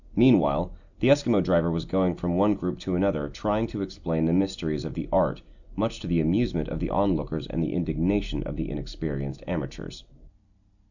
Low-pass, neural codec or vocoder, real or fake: 7.2 kHz; none; real